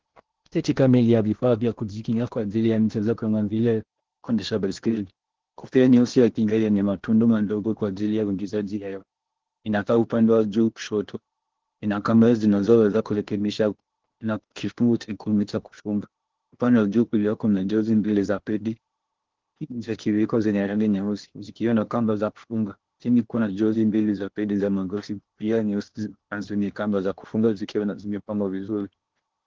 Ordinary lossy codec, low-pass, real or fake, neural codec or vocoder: Opus, 16 kbps; 7.2 kHz; fake; codec, 16 kHz in and 24 kHz out, 0.8 kbps, FocalCodec, streaming, 65536 codes